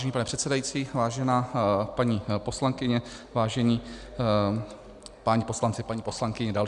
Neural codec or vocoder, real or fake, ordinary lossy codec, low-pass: none; real; Opus, 64 kbps; 10.8 kHz